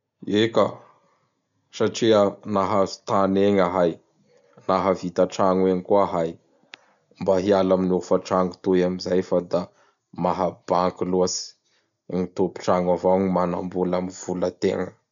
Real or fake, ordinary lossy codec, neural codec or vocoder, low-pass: real; none; none; 7.2 kHz